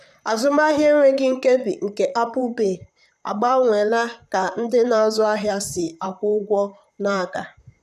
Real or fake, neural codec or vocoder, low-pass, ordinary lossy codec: fake; vocoder, 44.1 kHz, 128 mel bands, Pupu-Vocoder; 14.4 kHz; none